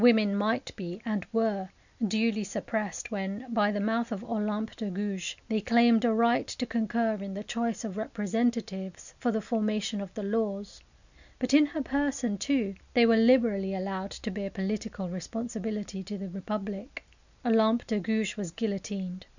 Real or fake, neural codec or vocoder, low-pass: real; none; 7.2 kHz